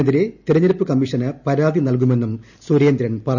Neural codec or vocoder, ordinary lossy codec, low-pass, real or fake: none; none; 7.2 kHz; real